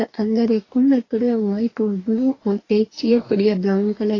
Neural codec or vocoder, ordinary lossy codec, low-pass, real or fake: codec, 44.1 kHz, 2.6 kbps, DAC; AAC, 32 kbps; 7.2 kHz; fake